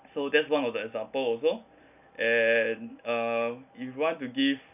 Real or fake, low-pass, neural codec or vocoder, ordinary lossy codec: real; 3.6 kHz; none; none